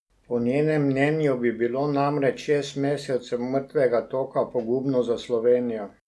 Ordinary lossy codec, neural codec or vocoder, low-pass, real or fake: none; none; none; real